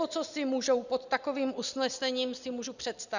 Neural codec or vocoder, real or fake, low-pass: none; real; 7.2 kHz